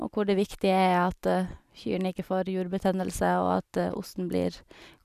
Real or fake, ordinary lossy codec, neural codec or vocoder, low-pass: real; none; none; 14.4 kHz